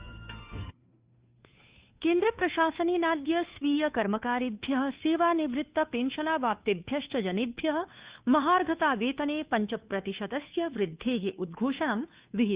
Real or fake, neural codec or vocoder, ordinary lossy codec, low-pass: fake; codec, 16 kHz, 2 kbps, FunCodec, trained on Chinese and English, 25 frames a second; Opus, 64 kbps; 3.6 kHz